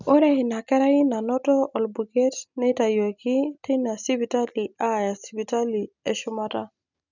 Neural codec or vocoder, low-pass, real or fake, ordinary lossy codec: none; 7.2 kHz; real; none